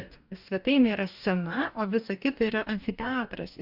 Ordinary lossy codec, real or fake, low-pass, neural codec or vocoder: Opus, 64 kbps; fake; 5.4 kHz; codec, 44.1 kHz, 2.6 kbps, DAC